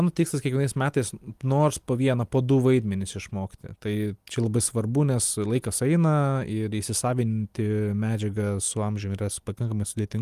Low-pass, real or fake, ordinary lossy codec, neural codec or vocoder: 14.4 kHz; real; Opus, 32 kbps; none